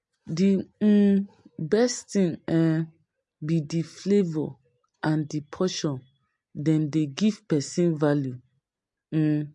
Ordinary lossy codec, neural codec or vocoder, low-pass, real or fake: MP3, 48 kbps; none; 10.8 kHz; real